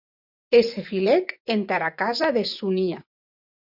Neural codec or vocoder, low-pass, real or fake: vocoder, 24 kHz, 100 mel bands, Vocos; 5.4 kHz; fake